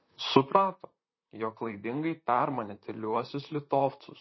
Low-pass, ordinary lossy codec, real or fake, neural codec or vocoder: 7.2 kHz; MP3, 24 kbps; fake; vocoder, 44.1 kHz, 128 mel bands, Pupu-Vocoder